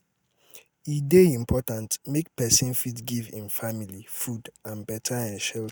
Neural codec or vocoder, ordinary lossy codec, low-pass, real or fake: none; none; none; real